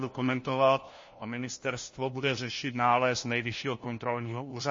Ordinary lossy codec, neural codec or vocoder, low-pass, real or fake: MP3, 32 kbps; codec, 16 kHz, 1 kbps, FunCodec, trained on LibriTTS, 50 frames a second; 7.2 kHz; fake